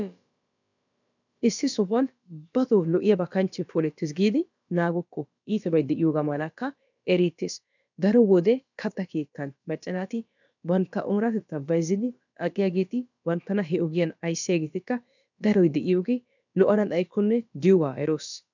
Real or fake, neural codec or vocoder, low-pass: fake; codec, 16 kHz, about 1 kbps, DyCAST, with the encoder's durations; 7.2 kHz